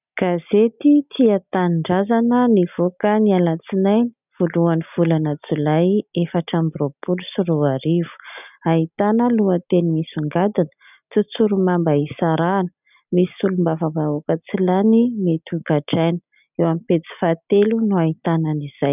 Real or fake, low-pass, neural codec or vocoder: real; 3.6 kHz; none